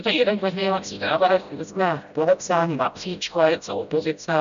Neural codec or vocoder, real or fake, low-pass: codec, 16 kHz, 0.5 kbps, FreqCodec, smaller model; fake; 7.2 kHz